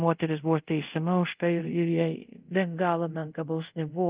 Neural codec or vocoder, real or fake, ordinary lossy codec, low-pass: codec, 24 kHz, 0.5 kbps, DualCodec; fake; Opus, 24 kbps; 3.6 kHz